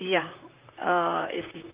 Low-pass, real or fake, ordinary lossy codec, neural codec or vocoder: 3.6 kHz; fake; Opus, 24 kbps; vocoder, 44.1 kHz, 128 mel bands, Pupu-Vocoder